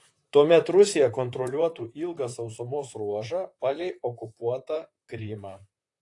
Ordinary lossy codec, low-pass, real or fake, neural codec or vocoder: AAC, 48 kbps; 10.8 kHz; fake; vocoder, 44.1 kHz, 128 mel bands, Pupu-Vocoder